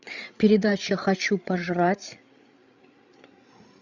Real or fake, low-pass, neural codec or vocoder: fake; 7.2 kHz; codec, 16 kHz, 8 kbps, FreqCodec, larger model